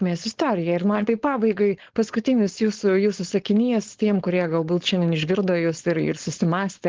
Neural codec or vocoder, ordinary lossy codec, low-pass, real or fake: codec, 16 kHz, 4.8 kbps, FACodec; Opus, 16 kbps; 7.2 kHz; fake